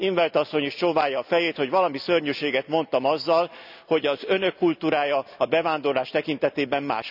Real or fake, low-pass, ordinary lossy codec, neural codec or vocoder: real; 5.4 kHz; none; none